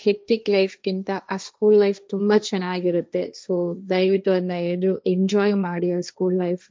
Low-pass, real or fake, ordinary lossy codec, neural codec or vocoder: none; fake; none; codec, 16 kHz, 1.1 kbps, Voila-Tokenizer